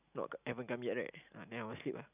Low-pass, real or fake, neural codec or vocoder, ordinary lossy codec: 3.6 kHz; real; none; none